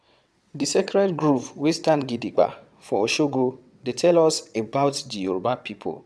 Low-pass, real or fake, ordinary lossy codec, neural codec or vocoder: none; fake; none; vocoder, 22.05 kHz, 80 mel bands, WaveNeXt